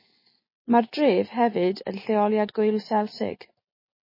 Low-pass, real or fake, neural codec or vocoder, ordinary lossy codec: 5.4 kHz; real; none; MP3, 24 kbps